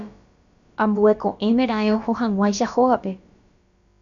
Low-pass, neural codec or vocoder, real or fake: 7.2 kHz; codec, 16 kHz, about 1 kbps, DyCAST, with the encoder's durations; fake